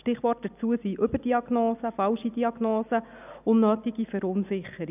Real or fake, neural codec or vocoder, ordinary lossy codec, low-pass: real; none; none; 3.6 kHz